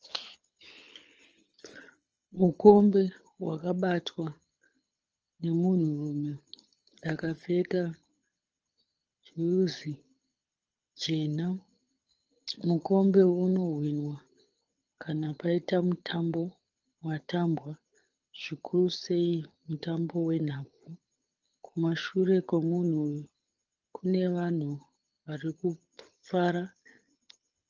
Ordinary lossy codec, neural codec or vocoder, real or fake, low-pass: Opus, 24 kbps; codec, 24 kHz, 6 kbps, HILCodec; fake; 7.2 kHz